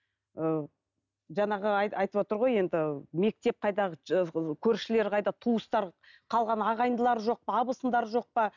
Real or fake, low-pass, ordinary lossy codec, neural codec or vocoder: real; 7.2 kHz; none; none